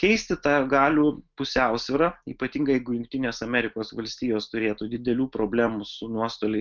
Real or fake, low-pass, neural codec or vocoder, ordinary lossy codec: real; 7.2 kHz; none; Opus, 32 kbps